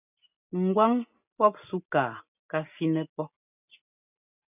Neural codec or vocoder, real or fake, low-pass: none; real; 3.6 kHz